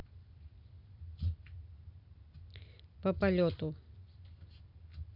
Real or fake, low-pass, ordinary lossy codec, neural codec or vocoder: real; 5.4 kHz; none; none